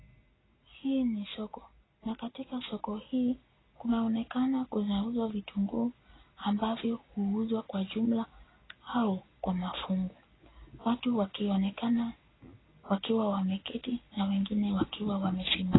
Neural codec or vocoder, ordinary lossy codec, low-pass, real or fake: none; AAC, 16 kbps; 7.2 kHz; real